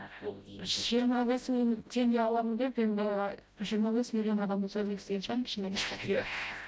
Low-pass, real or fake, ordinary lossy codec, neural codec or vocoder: none; fake; none; codec, 16 kHz, 0.5 kbps, FreqCodec, smaller model